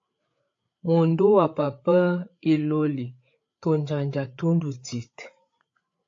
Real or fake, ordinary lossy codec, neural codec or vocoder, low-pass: fake; AAC, 48 kbps; codec, 16 kHz, 8 kbps, FreqCodec, larger model; 7.2 kHz